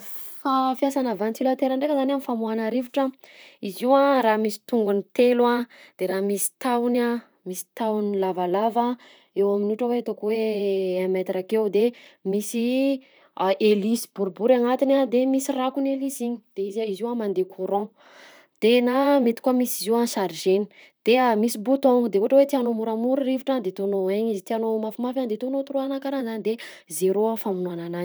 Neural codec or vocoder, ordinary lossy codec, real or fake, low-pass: vocoder, 44.1 kHz, 128 mel bands, Pupu-Vocoder; none; fake; none